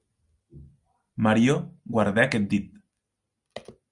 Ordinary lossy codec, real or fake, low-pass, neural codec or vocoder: Opus, 64 kbps; real; 10.8 kHz; none